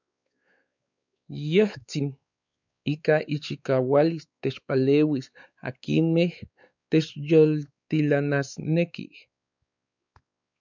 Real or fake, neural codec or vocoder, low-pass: fake; codec, 16 kHz, 4 kbps, X-Codec, WavLM features, trained on Multilingual LibriSpeech; 7.2 kHz